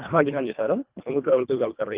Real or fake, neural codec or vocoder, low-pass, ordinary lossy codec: fake; codec, 24 kHz, 1.5 kbps, HILCodec; 3.6 kHz; Opus, 32 kbps